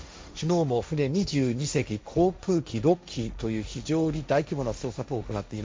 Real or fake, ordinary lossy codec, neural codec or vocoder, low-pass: fake; none; codec, 16 kHz, 1.1 kbps, Voila-Tokenizer; none